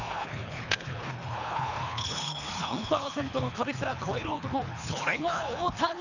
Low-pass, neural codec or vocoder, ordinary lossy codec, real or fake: 7.2 kHz; codec, 24 kHz, 3 kbps, HILCodec; none; fake